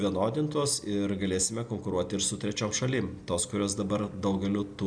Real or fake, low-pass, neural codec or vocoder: real; 9.9 kHz; none